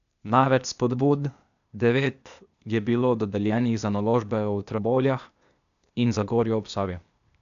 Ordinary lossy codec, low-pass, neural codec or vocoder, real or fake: none; 7.2 kHz; codec, 16 kHz, 0.8 kbps, ZipCodec; fake